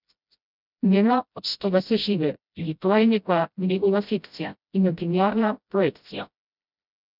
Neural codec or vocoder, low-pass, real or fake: codec, 16 kHz, 0.5 kbps, FreqCodec, smaller model; 5.4 kHz; fake